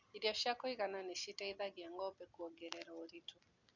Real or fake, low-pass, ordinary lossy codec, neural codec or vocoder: real; 7.2 kHz; none; none